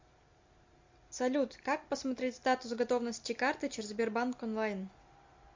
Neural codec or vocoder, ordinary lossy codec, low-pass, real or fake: none; MP3, 48 kbps; 7.2 kHz; real